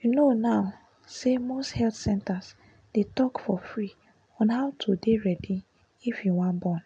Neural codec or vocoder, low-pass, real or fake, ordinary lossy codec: none; 9.9 kHz; real; MP3, 64 kbps